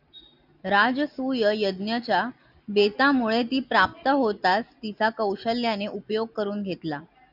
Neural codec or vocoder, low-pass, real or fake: none; 5.4 kHz; real